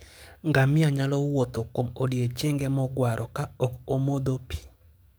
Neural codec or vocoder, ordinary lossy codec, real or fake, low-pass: codec, 44.1 kHz, 7.8 kbps, DAC; none; fake; none